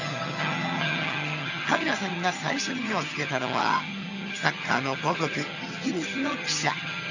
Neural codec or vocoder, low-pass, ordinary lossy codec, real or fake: vocoder, 22.05 kHz, 80 mel bands, HiFi-GAN; 7.2 kHz; none; fake